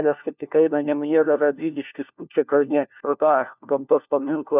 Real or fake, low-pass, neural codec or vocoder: fake; 3.6 kHz; codec, 16 kHz, 1 kbps, FunCodec, trained on LibriTTS, 50 frames a second